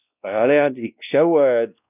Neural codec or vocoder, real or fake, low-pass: codec, 16 kHz, 1 kbps, X-Codec, WavLM features, trained on Multilingual LibriSpeech; fake; 3.6 kHz